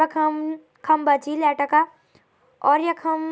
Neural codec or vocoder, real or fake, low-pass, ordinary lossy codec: none; real; none; none